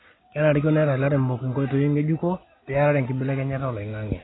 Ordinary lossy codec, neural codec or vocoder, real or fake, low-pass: AAC, 16 kbps; none; real; 7.2 kHz